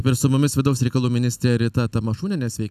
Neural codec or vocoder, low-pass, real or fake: none; 14.4 kHz; real